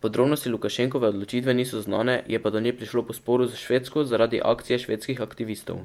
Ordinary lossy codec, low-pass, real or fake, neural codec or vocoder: MP3, 96 kbps; 19.8 kHz; fake; vocoder, 48 kHz, 128 mel bands, Vocos